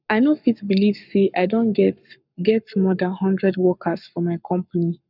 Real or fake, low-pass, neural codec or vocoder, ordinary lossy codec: fake; 5.4 kHz; codec, 44.1 kHz, 7.8 kbps, Pupu-Codec; none